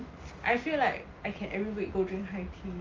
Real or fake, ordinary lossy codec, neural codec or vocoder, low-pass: real; Opus, 32 kbps; none; 7.2 kHz